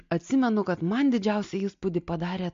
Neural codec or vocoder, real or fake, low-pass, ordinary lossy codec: none; real; 7.2 kHz; MP3, 48 kbps